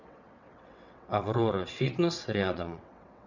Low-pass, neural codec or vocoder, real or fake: 7.2 kHz; vocoder, 22.05 kHz, 80 mel bands, WaveNeXt; fake